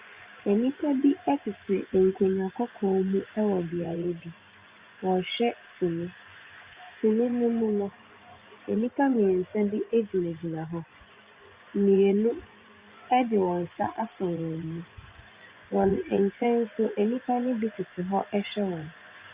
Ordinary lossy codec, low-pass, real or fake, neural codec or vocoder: Opus, 64 kbps; 3.6 kHz; fake; vocoder, 24 kHz, 100 mel bands, Vocos